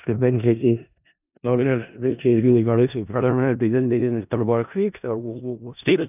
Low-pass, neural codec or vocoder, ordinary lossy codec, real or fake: 3.6 kHz; codec, 16 kHz in and 24 kHz out, 0.4 kbps, LongCat-Audio-Codec, four codebook decoder; none; fake